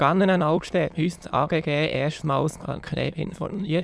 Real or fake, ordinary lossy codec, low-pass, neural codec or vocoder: fake; none; none; autoencoder, 22.05 kHz, a latent of 192 numbers a frame, VITS, trained on many speakers